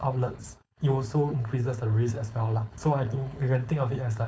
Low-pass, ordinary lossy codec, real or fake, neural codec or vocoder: none; none; fake; codec, 16 kHz, 4.8 kbps, FACodec